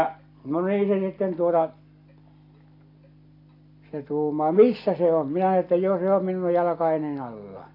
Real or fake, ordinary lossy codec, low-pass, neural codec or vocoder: real; AAC, 32 kbps; 5.4 kHz; none